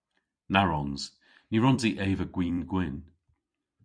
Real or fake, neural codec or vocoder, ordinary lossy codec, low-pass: fake; vocoder, 44.1 kHz, 128 mel bands every 256 samples, BigVGAN v2; MP3, 64 kbps; 9.9 kHz